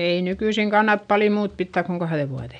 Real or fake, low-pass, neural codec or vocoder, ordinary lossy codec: real; 9.9 kHz; none; none